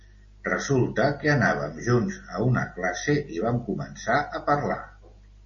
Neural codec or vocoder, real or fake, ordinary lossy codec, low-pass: none; real; MP3, 32 kbps; 7.2 kHz